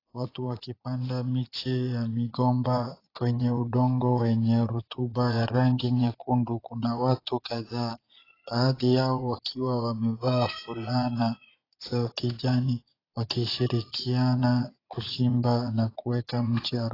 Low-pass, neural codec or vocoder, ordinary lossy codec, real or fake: 5.4 kHz; vocoder, 22.05 kHz, 80 mel bands, Vocos; AAC, 24 kbps; fake